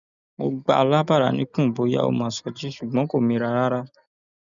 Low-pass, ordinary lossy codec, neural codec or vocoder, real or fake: 7.2 kHz; none; none; real